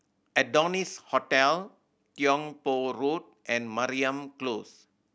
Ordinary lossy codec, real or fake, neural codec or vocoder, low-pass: none; real; none; none